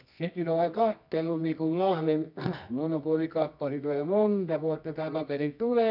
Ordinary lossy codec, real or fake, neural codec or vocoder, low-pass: none; fake; codec, 24 kHz, 0.9 kbps, WavTokenizer, medium music audio release; 5.4 kHz